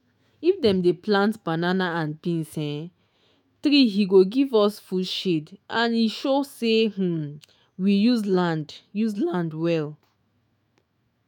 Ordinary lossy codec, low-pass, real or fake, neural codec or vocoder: none; 19.8 kHz; fake; autoencoder, 48 kHz, 128 numbers a frame, DAC-VAE, trained on Japanese speech